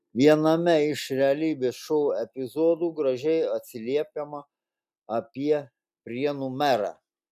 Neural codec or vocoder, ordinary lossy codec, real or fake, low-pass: none; AAC, 96 kbps; real; 14.4 kHz